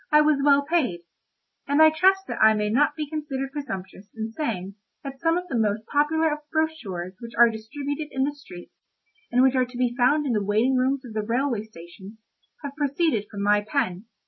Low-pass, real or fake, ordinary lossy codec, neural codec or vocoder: 7.2 kHz; real; MP3, 24 kbps; none